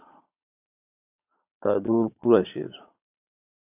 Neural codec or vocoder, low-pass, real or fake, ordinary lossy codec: vocoder, 22.05 kHz, 80 mel bands, Vocos; 3.6 kHz; fake; AAC, 32 kbps